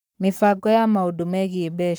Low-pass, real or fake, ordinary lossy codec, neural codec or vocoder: none; fake; none; codec, 44.1 kHz, 7.8 kbps, Pupu-Codec